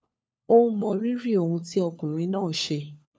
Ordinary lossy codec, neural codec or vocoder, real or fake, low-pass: none; codec, 16 kHz, 4 kbps, FunCodec, trained on LibriTTS, 50 frames a second; fake; none